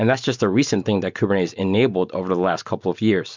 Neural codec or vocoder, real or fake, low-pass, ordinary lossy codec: none; real; 7.2 kHz; MP3, 64 kbps